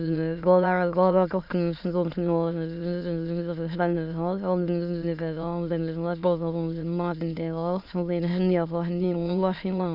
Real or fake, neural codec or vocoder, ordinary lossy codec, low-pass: fake; autoencoder, 22.05 kHz, a latent of 192 numbers a frame, VITS, trained on many speakers; none; 5.4 kHz